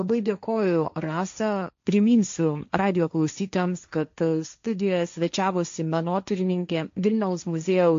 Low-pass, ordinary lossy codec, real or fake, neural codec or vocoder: 7.2 kHz; MP3, 48 kbps; fake; codec, 16 kHz, 1.1 kbps, Voila-Tokenizer